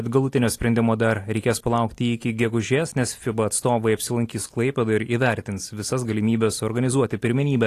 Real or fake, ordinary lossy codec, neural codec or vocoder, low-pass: real; AAC, 48 kbps; none; 14.4 kHz